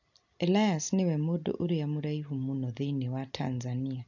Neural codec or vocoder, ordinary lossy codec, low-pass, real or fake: none; none; 7.2 kHz; real